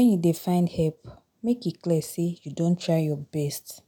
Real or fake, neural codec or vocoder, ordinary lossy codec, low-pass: real; none; none; none